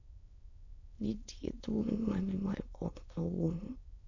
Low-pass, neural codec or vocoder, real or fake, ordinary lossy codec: 7.2 kHz; autoencoder, 22.05 kHz, a latent of 192 numbers a frame, VITS, trained on many speakers; fake; none